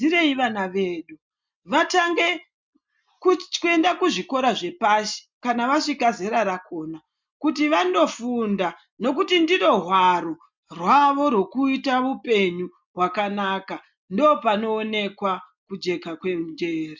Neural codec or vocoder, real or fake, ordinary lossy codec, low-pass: none; real; MP3, 64 kbps; 7.2 kHz